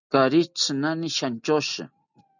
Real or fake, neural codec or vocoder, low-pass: real; none; 7.2 kHz